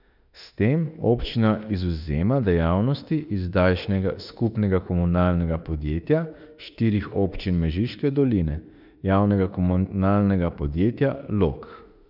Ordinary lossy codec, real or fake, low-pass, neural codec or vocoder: none; fake; 5.4 kHz; autoencoder, 48 kHz, 32 numbers a frame, DAC-VAE, trained on Japanese speech